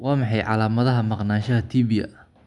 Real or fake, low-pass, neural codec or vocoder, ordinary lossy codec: real; 10.8 kHz; none; none